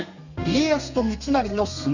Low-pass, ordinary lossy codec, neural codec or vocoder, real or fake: 7.2 kHz; none; codec, 32 kHz, 1.9 kbps, SNAC; fake